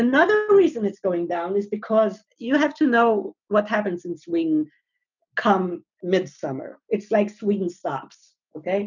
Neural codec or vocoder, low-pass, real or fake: none; 7.2 kHz; real